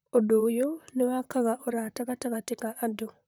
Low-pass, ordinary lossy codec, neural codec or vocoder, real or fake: none; none; vocoder, 44.1 kHz, 128 mel bands, Pupu-Vocoder; fake